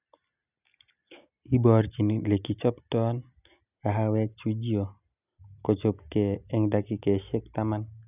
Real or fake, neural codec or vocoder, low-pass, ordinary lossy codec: real; none; 3.6 kHz; none